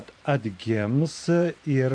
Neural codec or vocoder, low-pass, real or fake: vocoder, 44.1 kHz, 128 mel bands every 512 samples, BigVGAN v2; 9.9 kHz; fake